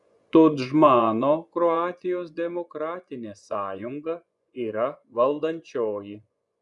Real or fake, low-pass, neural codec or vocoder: fake; 10.8 kHz; vocoder, 24 kHz, 100 mel bands, Vocos